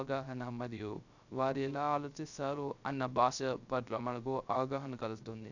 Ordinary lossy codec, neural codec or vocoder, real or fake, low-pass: none; codec, 16 kHz, 0.3 kbps, FocalCodec; fake; 7.2 kHz